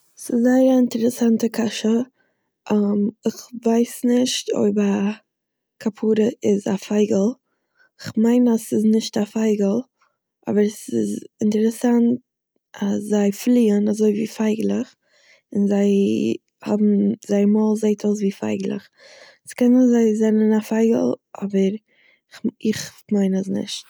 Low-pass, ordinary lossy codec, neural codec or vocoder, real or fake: none; none; none; real